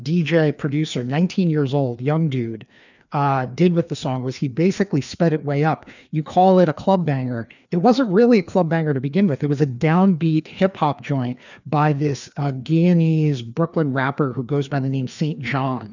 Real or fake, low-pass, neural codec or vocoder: fake; 7.2 kHz; codec, 16 kHz, 2 kbps, FreqCodec, larger model